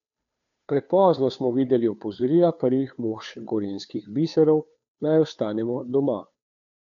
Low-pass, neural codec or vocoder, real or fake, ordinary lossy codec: 7.2 kHz; codec, 16 kHz, 2 kbps, FunCodec, trained on Chinese and English, 25 frames a second; fake; none